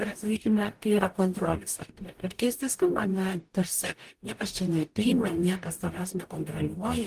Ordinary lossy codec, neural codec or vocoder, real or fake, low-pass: Opus, 24 kbps; codec, 44.1 kHz, 0.9 kbps, DAC; fake; 14.4 kHz